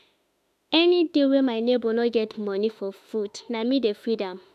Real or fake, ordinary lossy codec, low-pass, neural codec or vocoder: fake; none; 14.4 kHz; autoencoder, 48 kHz, 32 numbers a frame, DAC-VAE, trained on Japanese speech